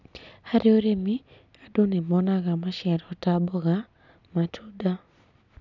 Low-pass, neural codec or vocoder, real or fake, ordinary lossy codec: 7.2 kHz; none; real; none